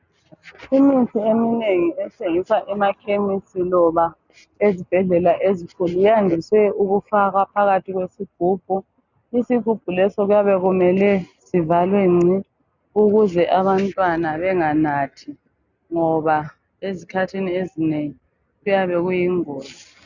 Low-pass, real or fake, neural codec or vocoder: 7.2 kHz; real; none